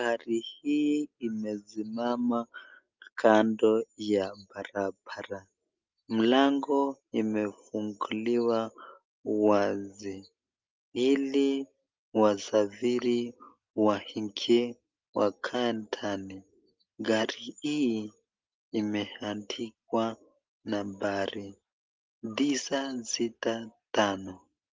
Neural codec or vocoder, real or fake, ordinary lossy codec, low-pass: none; real; Opus, 24 kbps; 7.2 kHz